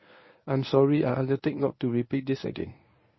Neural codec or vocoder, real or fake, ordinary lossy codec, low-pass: codec, 24 kHz, 0.9 kbps, WavTokenizer, medium speech release version 1; fake; MP3, 24 kbps; 7.2 kHz